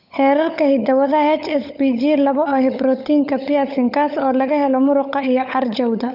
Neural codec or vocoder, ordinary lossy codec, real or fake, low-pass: codec, 16 kHz, 16 kbps, FunCodec, trained on LibriTTS, 50 frames a second; none; fake; 5.4 kHz